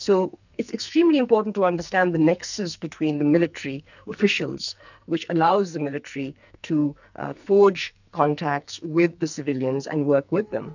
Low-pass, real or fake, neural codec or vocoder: 7.2 kHz; fake; codec, 44.1 kHz, 2.6 kbps, SNAC